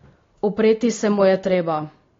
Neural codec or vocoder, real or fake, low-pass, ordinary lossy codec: none; real; 7.2 kHz; AAC, 32 kbps